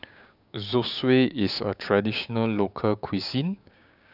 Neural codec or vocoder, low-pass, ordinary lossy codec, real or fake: codec, 16 kHz, 6 kbps, DAC; 5.4 kHz; none; fake